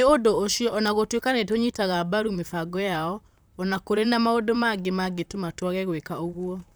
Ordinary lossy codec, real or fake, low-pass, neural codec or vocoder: none; fake; none; vocoder, 44.1 kHz, 128 mel bands, Pupu-Vocoder